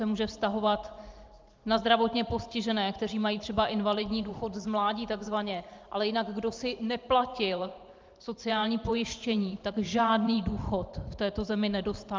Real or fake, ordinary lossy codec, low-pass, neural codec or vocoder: fake; Opus, 32 kbps; 7.2 kHz; vocoder, 44.1 kHz, 128 mel bands every 512 samples, BigVGAN v2